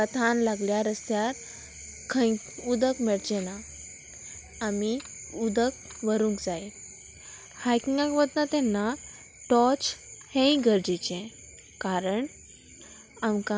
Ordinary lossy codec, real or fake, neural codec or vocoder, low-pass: none; real; none; none